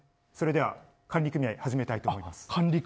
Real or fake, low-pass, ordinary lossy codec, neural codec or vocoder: real; none; none; none